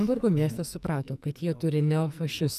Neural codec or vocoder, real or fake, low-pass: codec, 44.1 kHz, 2.6 kbps, SNAC; fake; 14.4 kHz